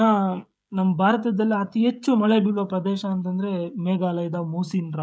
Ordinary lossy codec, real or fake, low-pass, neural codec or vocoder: none; fake; none; codec, 16 kHz, 16 kbps, FreqCodec, smaller model